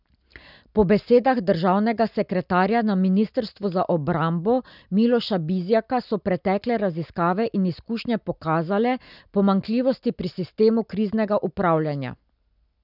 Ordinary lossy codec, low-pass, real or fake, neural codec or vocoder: none; 5.4 kHz; real; none